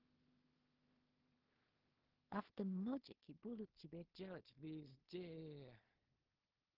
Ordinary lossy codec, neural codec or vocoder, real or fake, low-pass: Opus, 16 kbps; codec, 16 kHz in and 24 kHz out, 0.4 kbps, LongCat-Audio-Codec, two codebook decoder; fake; 5.4 kHz